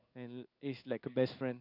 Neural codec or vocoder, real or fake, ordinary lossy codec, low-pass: codec, 16 kHz in and 24 kHz out, 1 kbps, XY-Tokenizer; fake; none; 5.4 kHz